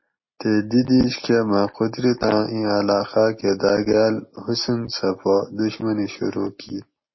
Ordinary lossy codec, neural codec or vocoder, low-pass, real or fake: MP3, 24 kbps; none; 7.2 kHz; real